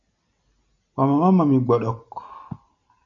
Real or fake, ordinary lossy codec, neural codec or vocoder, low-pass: real; MP3, 96 kbps; none; 7.2 kHz